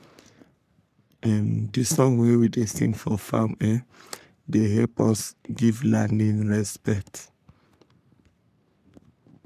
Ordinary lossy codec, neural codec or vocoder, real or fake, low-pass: none; codec, 44.1 kHz, 3.4 kbps, Pupu-Codec; fake; 14.4 kHz